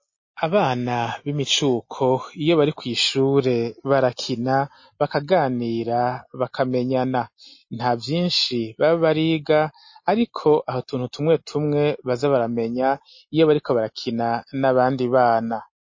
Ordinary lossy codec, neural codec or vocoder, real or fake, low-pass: MP3, 32 kbps; none; real; 7.2 kHz